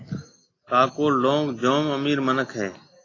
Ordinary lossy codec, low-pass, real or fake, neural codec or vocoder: AAC, 32 kbps; 7.2 kHz; real; none